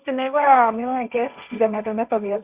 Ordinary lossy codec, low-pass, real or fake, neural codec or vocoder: Opus, 64 kbps; 3.6 kHz; fake; codec, 16 kHz, 1.1 kbps, Voila-Tokenizer